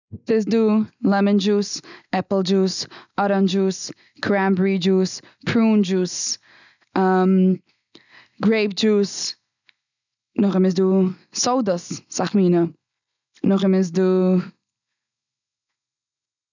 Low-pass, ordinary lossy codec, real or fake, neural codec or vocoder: 7.2 kHz; none; real; none